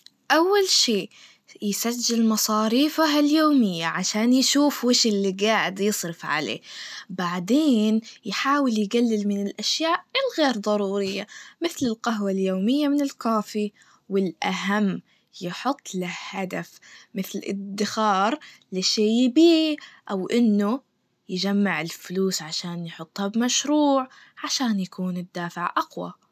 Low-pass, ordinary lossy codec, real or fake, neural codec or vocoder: 14.4 kHz; none; real; none